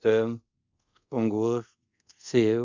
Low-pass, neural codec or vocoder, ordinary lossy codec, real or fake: 7.2 kHz; codec, 24 kHz, 0.5 kbps, DualCodec; Opus, 64 kbps; fake